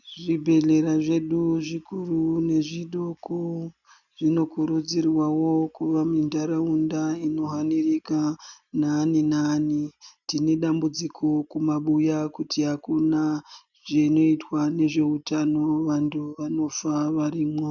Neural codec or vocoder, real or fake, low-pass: none; real; 7.2 kHz